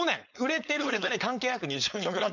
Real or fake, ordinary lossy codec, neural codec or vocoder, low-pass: fake; none; codec, 16 kHz, 4.8 kbps, FACodec; 7.2 kHz